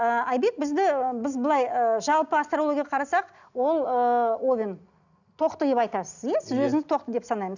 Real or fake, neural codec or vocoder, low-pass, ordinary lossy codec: real; none; 7.2 kHz; none